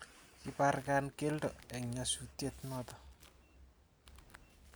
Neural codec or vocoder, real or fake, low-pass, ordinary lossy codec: none; real; none; none